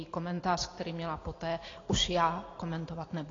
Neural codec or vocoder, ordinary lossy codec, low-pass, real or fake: none; AAC, 32 kbps; 7.2 kHz; real